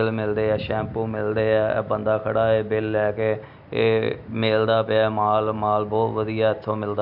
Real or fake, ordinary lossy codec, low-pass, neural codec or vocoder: real; MP3, 48 kbps; 5.4 kHz; none